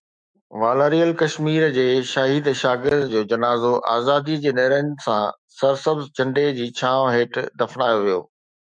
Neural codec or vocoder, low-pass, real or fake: autoencoder, 48 kHz, 128 numbers a frame, DAC-VAE, trained on Japanese speech; 9.9 kHz; fake